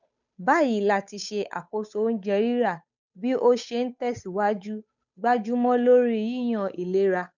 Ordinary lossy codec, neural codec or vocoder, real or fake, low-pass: none; codec, 16 kHz, 8 kbps, FunCodec, trained on Chinese and English, 25 frames a second; fake; 7.2 kHz